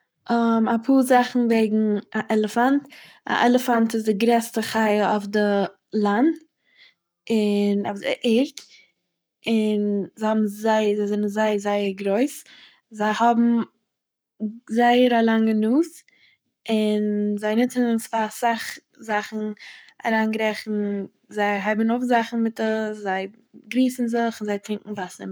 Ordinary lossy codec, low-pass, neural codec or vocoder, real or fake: none; none; codec, 44.1 kHz, 7.8 kbps, Pupu-Codec; fake